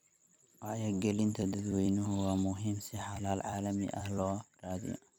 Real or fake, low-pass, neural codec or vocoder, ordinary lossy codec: fake; none; vocoder, 44.1 kHz, 128 mel bands every 256 samples, BigVGAN v2; none